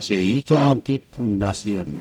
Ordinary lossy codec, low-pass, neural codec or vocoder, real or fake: none; 19.8 kHz; codec, 44.1 kHz, 0.9 kbps, DAC; fake